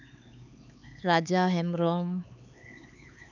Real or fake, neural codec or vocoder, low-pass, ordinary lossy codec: fake; codec, 16 kHz, 4 kbps, X-Codec, HuBERT features, trained on LibriSpeech; 7.2 kHz; none